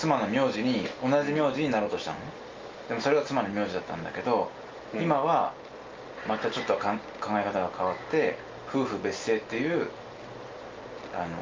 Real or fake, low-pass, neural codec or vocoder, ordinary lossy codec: real; 7.2 kHz; none; Opus, 32 kbps